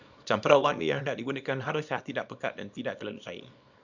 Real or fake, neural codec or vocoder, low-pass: fake; codec, 24 kHz, 0.9 kbps, WavTokenizer, small release; 7.2 kHz